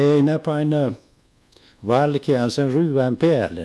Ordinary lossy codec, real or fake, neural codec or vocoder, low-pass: none; fake; codec, 24 kHz, 1.2 kbps, DualCodec; none